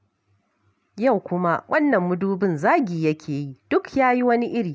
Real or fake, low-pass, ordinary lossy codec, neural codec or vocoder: real; none; none; none